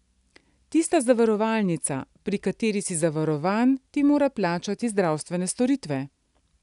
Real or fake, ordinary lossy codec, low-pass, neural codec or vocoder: real; none; 10.8 kHz; none